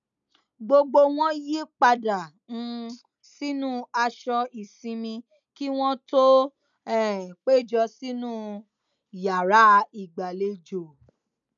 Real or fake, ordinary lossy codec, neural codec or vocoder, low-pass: real; none; none; 7.2 kHz